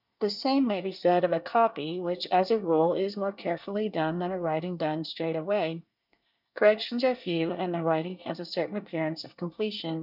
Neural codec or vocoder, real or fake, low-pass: codec, 24 kHz, 1 kbps, SNAC; fake; 5.4 kHz